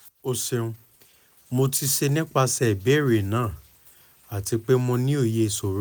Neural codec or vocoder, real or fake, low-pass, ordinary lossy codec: none; real; none; none